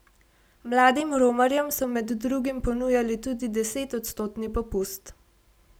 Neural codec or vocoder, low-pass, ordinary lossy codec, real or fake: none; none; none; real